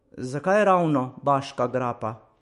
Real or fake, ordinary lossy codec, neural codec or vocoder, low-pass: fake; MP3, 48 kbps; autoencoder, 48 kHz, 128 numbers a frame, DAC-VAE, trained on Japanese speech; 14.4 kHz